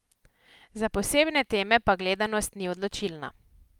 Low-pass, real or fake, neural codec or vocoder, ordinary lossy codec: 19.8 kHz; real; none; Opus, 32 kbps